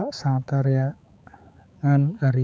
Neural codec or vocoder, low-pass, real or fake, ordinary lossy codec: codec, 16 kHz, 4 kbps, X-Codec, HuBERT features, trained on balanced general audio; none; fake; none